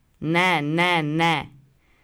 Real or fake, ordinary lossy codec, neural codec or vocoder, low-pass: real; none; none; none